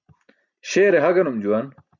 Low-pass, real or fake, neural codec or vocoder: 7.2 kHz; real; none